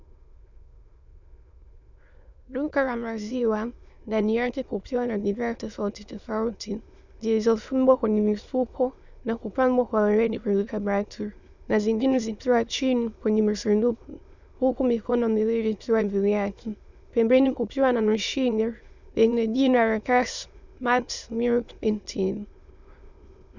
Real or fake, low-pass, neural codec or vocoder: fake; 7.2 kHz; autoencoder, 22.05 kHz, a latent of 192 numbers a frame, VITS, trained on many speakers